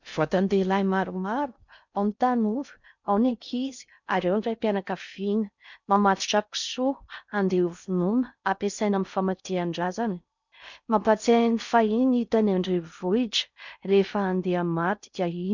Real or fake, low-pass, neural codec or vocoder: fake; 7.2 kHz; codec, 16 kHz in and 24 kHz out, 0.6 kbps, FocalCodec, streaming, 2048 codes